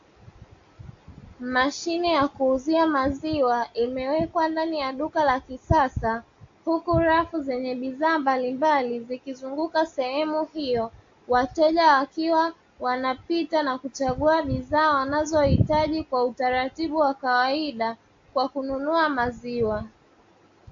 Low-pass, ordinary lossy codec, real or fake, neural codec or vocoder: 7.2 kHz; AAC, 48 kbps; real; none